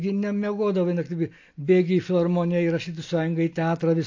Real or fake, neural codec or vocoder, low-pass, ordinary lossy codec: real; none; 7.2 kHz; AAC, 48 kbps